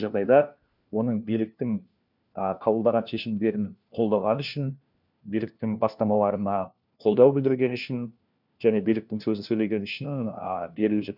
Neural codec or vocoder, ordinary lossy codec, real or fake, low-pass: codec, 16 kHz, 1 kbps, FunCodec, trained on LibriTTS, 50 frames a second; none; fake; 5.4 kHz